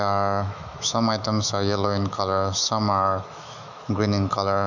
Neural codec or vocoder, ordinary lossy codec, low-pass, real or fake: autoencoder, 48 kHz, 128 numbers a frame, DAC-VAE, trained on Japanese speech; none; 7.2 kHz; fake